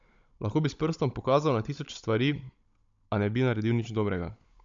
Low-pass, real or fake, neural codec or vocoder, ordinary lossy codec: 7.2 kHz; fake; codec, 16 kHz, 16 kbps, FunCodec, trained on Chinese and English, 50 frames a second; AAC, 64 kbps